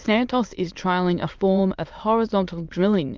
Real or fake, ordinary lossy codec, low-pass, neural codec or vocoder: fake; Opus, 24 kbps; 7.2 kHz; autoencoder, 22.05 kHz, a latent of 192 numbers a frame, VITS, trained on many speakers